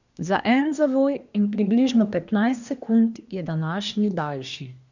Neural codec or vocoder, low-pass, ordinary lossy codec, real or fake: codec, 24 kHz, 1 kbps, SNAC; 7.2 kHz; none; fake